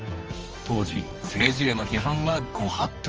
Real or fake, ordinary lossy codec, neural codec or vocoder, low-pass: fake; Opus, 24 kbps; codec, 24 kHz, 0.9 kbps, WavTokenizer, medium music audio release; 7.2 kHz